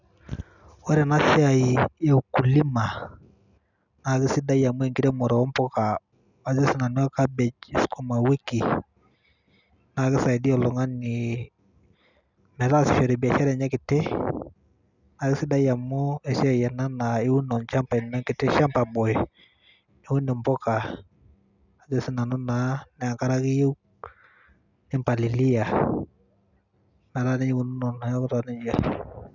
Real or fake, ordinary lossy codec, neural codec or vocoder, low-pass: real; none; none; 7.2 kHz